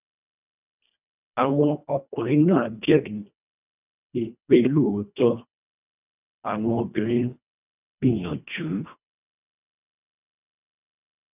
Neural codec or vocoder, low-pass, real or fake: codec, 24 kHz, 1.5 kbps, HILCodec; 3.6 kHz; fake